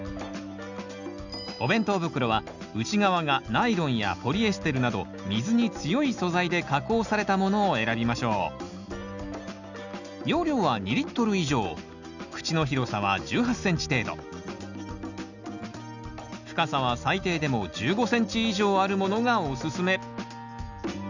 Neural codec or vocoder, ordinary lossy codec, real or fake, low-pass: none; none; real; 7.2 kHz